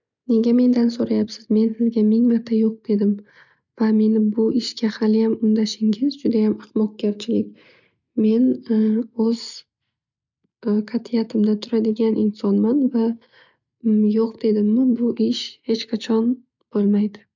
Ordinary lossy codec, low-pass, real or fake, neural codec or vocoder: none; 7.2 kHz; real; none